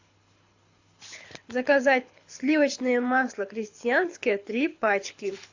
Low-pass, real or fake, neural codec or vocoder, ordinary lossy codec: 7.2 kHz; fake; codec, 24 kHz, 6 kbps, HILCodec; AAC, 48 kbps